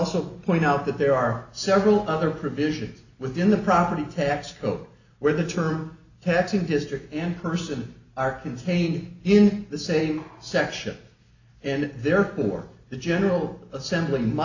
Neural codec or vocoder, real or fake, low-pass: none; real; 7.2 kHz